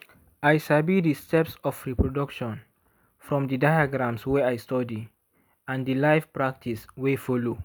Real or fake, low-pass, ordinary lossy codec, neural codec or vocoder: real; none; none; none